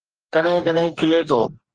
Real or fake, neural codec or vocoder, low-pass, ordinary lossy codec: fake; codec, 44.1 kHz, 2.6 kbps, DAC; 9.9 kHz; Opus, 16 kbps